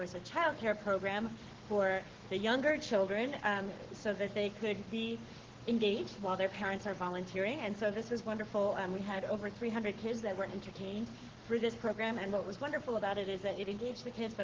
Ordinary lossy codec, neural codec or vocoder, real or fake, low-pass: Opus, 16 kbps; codec, 44.1 kHz, 7.8 kbps, Pupu-Codec; fake; 7.2 kHz